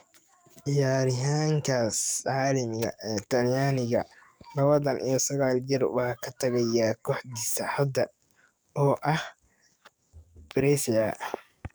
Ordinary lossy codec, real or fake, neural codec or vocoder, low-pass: none; fake; codec, 44.1 kHz, 7.8 kbps, DAC; none